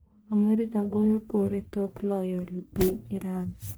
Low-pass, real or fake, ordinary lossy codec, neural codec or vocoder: none; fake; none; codec, 44.1 kHz, 1.7 kbps, Pupu-Codec